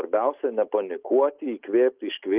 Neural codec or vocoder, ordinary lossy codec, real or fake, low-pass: none; Opus, 32 kbps; real; 3.6 kHz